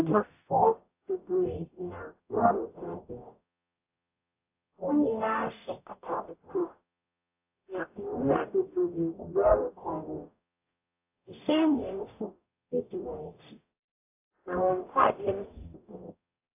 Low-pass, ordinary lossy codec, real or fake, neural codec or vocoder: 3.6 kHz; AAC, 32 kbps; fake; codec, 44.1 kHz, 0.9 kbps, DAC